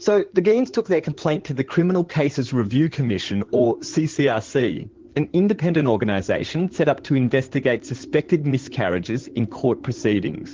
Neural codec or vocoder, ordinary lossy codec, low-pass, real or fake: codec, 16 kHz in and 24 kHz out, 2.2 kbps, FireRedTTS-2 codec; Opus, 16 kbps; 7.2 kHz; fake